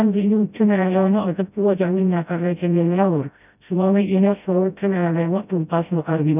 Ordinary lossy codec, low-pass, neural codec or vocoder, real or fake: none; 3.6 kHz; codec, 16 kHz, 0.5 kbps, FreqCodec, smaller model; fake